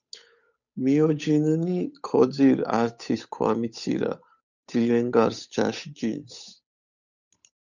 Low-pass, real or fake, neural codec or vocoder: 7.2 kHz; fake; codec, 16 kHz, 8 kbps, FunCodec, trained on Chinese and English, 25 frames a second